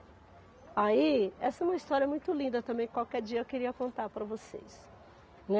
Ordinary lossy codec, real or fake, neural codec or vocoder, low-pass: none; real; none; none